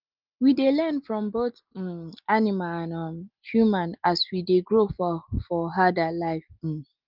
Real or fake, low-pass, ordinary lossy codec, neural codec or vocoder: real; 5.4 kHz; Opus, 32 kbps; none